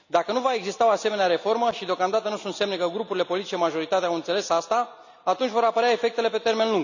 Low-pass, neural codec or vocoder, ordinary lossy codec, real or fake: 7.2 kHz; none; none; real